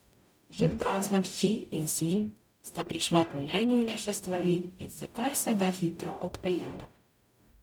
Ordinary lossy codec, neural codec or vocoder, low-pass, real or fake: none; codec, 44.1 kHz, 0.9 kbps, DAC; none; fake